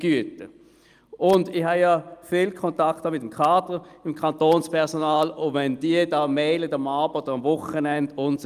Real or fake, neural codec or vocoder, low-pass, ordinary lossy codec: real; none; 14.4 kHz; Opus, 32 kbps